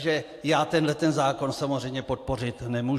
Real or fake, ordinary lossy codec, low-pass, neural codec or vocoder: real; AAC, 64 kbps; 14.4 kHz; none